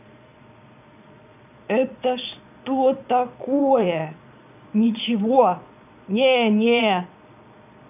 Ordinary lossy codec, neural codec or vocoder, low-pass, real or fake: none; vocoder, 22.05 kHz, 80 mel bands, Vocos; 3.6 kHz; fake